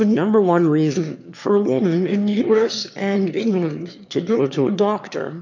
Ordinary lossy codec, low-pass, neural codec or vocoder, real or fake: MP3, 64 kbps; 7.2 kHz; autoencoder, 22.05 kHz, a latent of 192 numbers a frame, VITS, trained on one speaker; fake